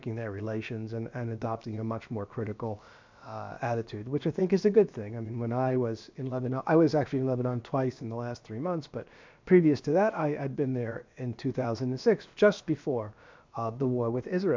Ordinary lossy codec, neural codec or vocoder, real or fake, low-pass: MP3, 64 kbps; codec, 16 kHz, about 1 kbps, DyCAST, with the encoder's durations; fake; 7.2 kHz